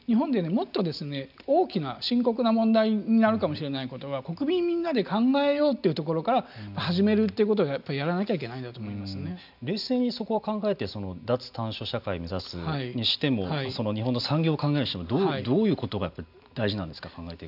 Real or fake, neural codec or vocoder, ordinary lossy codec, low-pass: real; none; none; 5.4 kHz